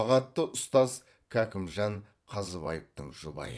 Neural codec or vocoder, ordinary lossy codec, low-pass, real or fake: vocoder, 22.05 kHz, 80 mel bands, WaveNeXt; none; none; fake